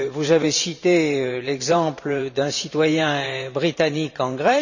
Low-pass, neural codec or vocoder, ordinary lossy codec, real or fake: 7.2 kHz; vocoder, 44.1 kHz, 128 mel bands every 512 samples, BigVGAN v2; none; fake